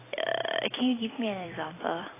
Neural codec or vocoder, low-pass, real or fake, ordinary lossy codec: vocoder, 44.1 kHz, 128 mel bands every 256 samples, BigVGAN v2; 3.6 kHz; fake; AAC, 16 kbps